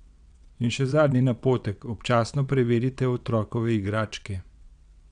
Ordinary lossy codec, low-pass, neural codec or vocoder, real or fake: none; 9.9 kHz; vocoder, 22.05 kHz, 80 mel bands, WaveNeXt; fake